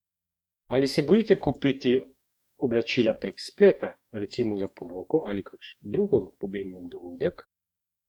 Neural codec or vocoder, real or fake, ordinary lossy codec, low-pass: codec, 44.1 kHz, 2.6 kbps, DAC; fake; none; 19.8 kHz